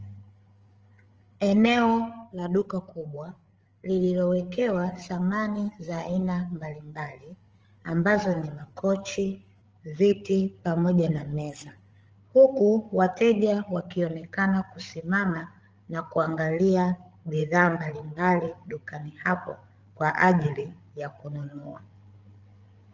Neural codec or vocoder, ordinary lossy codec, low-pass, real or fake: codec, 16 kHz, 8 kbps, FreqCodec, larger model; Opus, 24 kbps; 7.2 kHz; fake